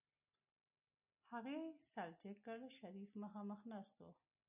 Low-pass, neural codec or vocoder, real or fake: 3.6 kHz; none; real